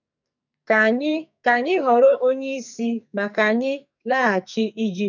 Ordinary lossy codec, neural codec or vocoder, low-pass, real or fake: none; codec, 44.1 kHz, 2.6 kbps, SNAC; 7.2 kHz; fake